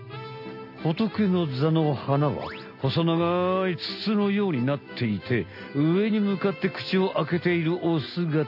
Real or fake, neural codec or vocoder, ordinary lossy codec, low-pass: real; none; AAC, 48 kbps; 5.4 kHz